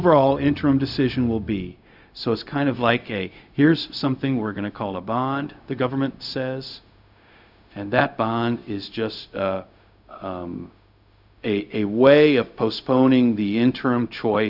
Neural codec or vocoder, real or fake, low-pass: codec, 16 kHz, 0.4 kbps, LongCat-Audio-Codec; fake; 5.4 kHz